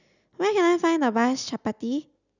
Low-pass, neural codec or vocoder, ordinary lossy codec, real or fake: 7.2 kHz; none; none; real